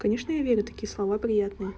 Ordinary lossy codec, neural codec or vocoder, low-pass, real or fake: none; none; none; real